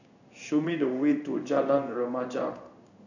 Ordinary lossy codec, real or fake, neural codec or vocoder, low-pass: none; fake; codec, 16 kHz in and 24 kHz out, 1 kbps, XY-Tokenizer; 7.2 kHz